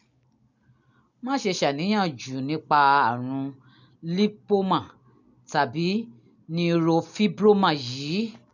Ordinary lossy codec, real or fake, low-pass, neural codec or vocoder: none; real; 7.2 kHz; none